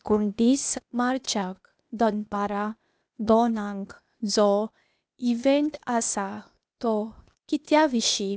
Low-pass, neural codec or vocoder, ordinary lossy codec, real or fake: none; codec, 16 kHz, 0.8 kbps, ZipCodec; none; fake